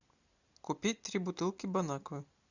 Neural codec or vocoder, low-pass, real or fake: none; 7.2 kHz; real